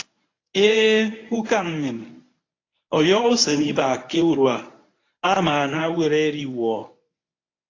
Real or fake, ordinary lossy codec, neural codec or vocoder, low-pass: fake; AAC, 32 kbps; codec, 24 kHz, 0.9 kbps, WavTokenizer, medium speech release version 1; 7.2 kHz